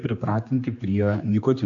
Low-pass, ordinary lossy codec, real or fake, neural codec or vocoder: 7.2 kHz; AAC, 64 kbps; fake; codec, 16 kHz, 2 kbps, X-Codec, HuBERT features, trained on general audio